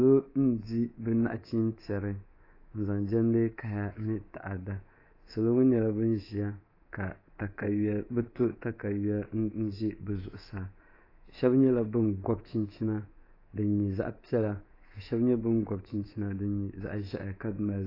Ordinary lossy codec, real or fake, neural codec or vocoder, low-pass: AAC, 24 kbps; fake; codec, 24 kHz, 3.1 kbps, DualCodec; 5.4 kHz